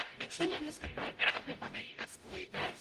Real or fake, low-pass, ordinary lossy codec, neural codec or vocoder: fake; 14.4 kHz; Opus, 24 kbps; codec, 44.1 kHz, 0.9 kbps, DAC